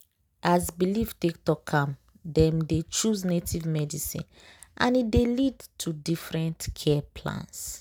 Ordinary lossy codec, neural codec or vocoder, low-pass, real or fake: none; none; none; real